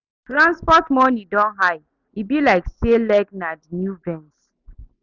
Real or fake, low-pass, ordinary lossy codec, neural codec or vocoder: real; 7.2 kHz; none; none